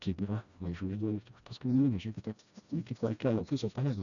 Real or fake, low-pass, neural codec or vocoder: fake; 7.2 kHz; codec, 16 kHz, 1 kbps, FreqCodec, smaller model